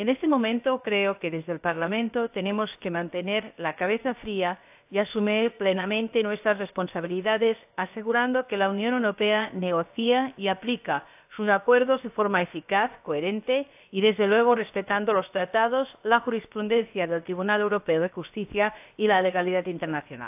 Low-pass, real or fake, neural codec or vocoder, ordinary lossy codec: 3.6 kHz; fake; codec, 16 kHz, about 1 kbps, DyCAST, with the encoder's durations; AAC, 32 kbps